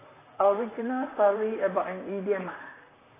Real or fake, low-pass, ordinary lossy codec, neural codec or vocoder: fake; 3.6 kHz; AAC, 16 kbps; vocoder, 44.1 kHz, 128 mel bands, Pupu-Vocoder